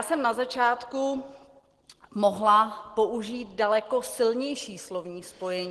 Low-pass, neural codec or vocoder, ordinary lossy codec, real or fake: 10.8 kHz; none; Opus, 16 kbps; real